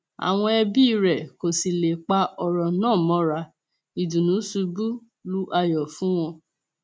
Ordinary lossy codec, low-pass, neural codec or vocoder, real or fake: none; none; none; real